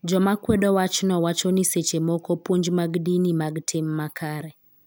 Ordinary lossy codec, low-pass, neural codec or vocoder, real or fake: none; none; none; real